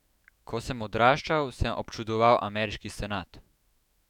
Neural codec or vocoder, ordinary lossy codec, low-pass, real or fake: autoencoder, 48 kHz, 128 numbers a frame, DAC-VAE, trained on Japanese speech; none; 19.8 kHz; fake